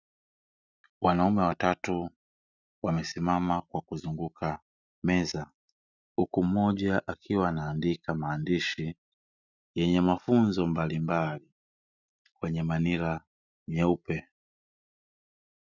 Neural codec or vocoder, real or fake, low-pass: none; real; 7.2 kHz